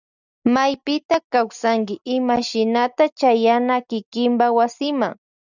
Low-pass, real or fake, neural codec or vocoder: 7.2 kHz; real; none